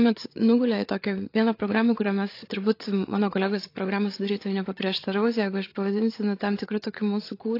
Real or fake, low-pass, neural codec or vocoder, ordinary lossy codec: fake; 5.4 kHz; codec, 16 kHz, 8 kbps, FreqCodec, larger model; AAC, 32 kbps